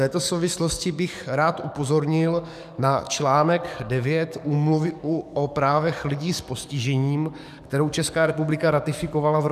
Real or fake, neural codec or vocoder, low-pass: fake; codec, 44.1 kHz, 7.8 kbps, DAC; 14.4 kHz